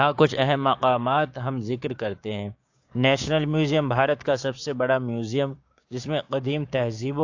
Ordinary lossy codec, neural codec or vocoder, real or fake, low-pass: AAC, 48 kbps; codec, 16 kHz, 6 kbps, DAC; fake; 7.2 kHz